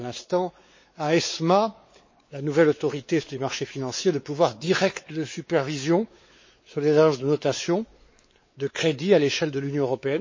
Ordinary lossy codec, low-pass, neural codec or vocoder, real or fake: MP3, 32 kbps; 7.2 kHz; codec, 16 kHz, 4 kbps, X-Codec, WavLM features, trained on Multilingual LibriSpeech; fake